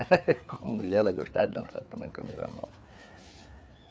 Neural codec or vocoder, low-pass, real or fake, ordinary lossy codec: codec, 16 kHz, 4 kbps, FreqCodec, larger model; none; fake; none